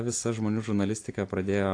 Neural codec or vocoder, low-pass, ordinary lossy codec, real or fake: none; 9.9 kHz; AAC, 48 kbps; real